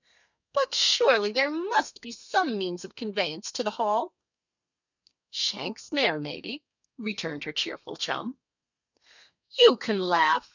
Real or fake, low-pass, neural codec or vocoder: fake; 7.2 kHz; codec, 32 kHz, 1.9 kbps, SNAC